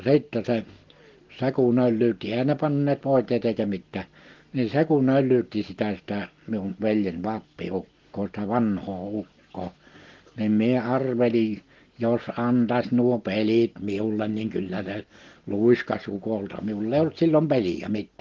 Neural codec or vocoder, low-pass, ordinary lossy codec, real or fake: none; 7.2 kHz; Opus, 16 kbps; real